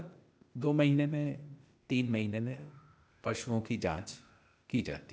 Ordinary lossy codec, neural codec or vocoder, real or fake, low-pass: none; codec, 16 kHz, 0.8 kbps, ZipCodec; fake; none